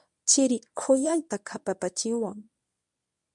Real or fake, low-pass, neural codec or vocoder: fake; 10.8 kHz; codec, 24 kHz, 0.9 kbps, WavTokenizer, medium speech release version 1